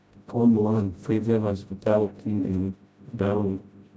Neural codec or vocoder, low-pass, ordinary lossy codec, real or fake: codec, 16 kHz, 0.5 kbps, FreqCodec, smaller model; none; none; fake